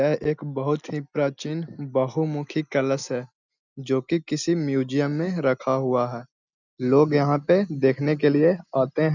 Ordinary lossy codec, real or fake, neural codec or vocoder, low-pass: none; real; none; 7.2 kHz